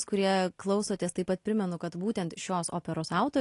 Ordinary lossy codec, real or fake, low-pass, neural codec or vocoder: AAC, 48 kbps; real; 10.8 kHz; none